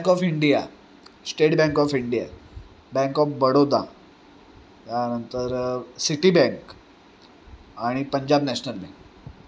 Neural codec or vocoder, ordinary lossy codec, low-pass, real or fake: none; none; none; real